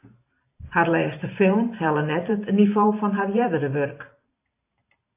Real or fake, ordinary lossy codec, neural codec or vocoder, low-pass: fake; AAC, 24 kbps; vocoder, 44.1 kHz, 128 mel bands every 512 samples, BigVGAN v2; 3.6 kHz